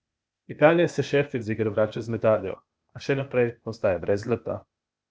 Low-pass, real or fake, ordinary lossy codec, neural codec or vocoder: none; fake; none; codec, 16 kHz, 0.8 kbps, ZipCodec